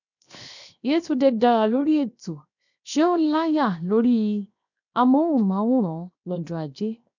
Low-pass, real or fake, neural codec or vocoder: 7.2 kHz; fake; codec, 16 kHz, 0.7 kbps, FocalCodec